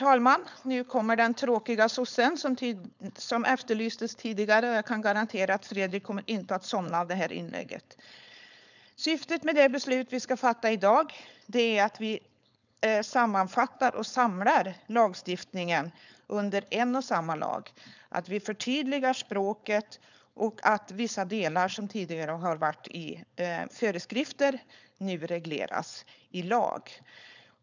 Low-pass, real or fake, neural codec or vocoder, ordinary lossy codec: 7.2 kHz; fake; codec, 16 kHz, 4.8 kbps, FACodec; none